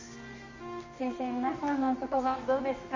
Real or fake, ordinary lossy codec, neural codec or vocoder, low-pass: fake; MP3, 32 kbps; codec, 24 kHz, 0.9 kbps, WavTokenizer, medium music audio release; 7.2 kHz